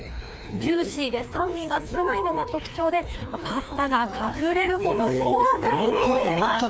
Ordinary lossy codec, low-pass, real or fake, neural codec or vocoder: none; none; fake; codec, 16 kHz, 2 kbps, FreqCodec, larger model